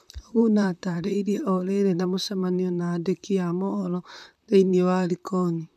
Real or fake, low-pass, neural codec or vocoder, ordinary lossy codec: fake; 14.4 kHz; vocoder, 44.1 kHz, 128 mel bands, Pupu-Vocoder; none